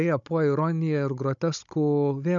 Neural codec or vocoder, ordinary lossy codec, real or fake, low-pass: codec, 16 kHz, 16 kbps, FunCodec, trained on Chinese and English, 50 frames a second; MP3, 96 kbps; fake; 7.2 kHz